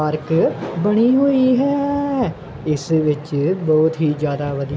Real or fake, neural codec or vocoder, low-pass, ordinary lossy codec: real; none; none; none